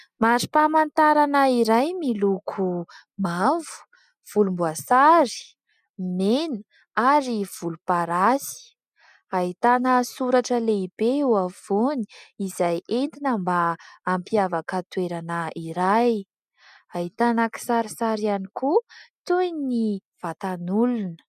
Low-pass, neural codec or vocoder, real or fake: 14.4 kHz; none; real